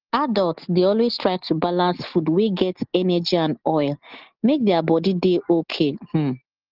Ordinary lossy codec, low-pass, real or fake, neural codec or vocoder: Opus, 16 kbps; 5.4 kHz; real; none